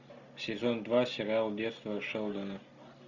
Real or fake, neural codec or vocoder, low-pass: real; none; 7.2 kHz